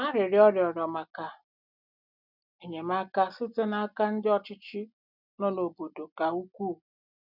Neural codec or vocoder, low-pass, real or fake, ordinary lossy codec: none; 5.4 kHz; real; none